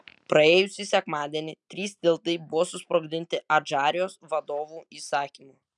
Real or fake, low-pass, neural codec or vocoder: real; 10.8 kHz; none